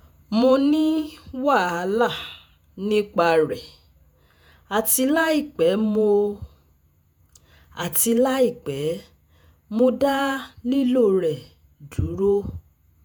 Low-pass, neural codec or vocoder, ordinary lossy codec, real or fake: none; vocoder, 48 kHz, 128 mel bands, Vocos; none; fake